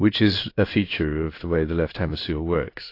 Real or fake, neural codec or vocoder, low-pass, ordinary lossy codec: fake; codec, 16 kHz in and 24 kHz out, 1 kbps, XY-Tokenizer; 5.4 kHz; AAC, 32 kbps